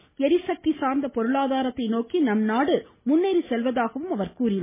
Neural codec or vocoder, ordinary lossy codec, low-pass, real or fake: none; MP3, 16 kbps; 3.6 kHz; real